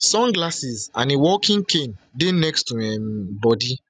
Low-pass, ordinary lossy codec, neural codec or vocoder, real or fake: 9.9 kHz; none; none; real